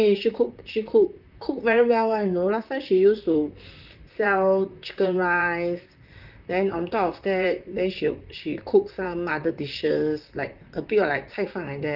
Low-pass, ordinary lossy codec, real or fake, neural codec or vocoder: 5.4 kHz; Opus, 32 kbps; fake; vocoder, 44.1 kHz, 128 mel bands, Pupu-Vocoder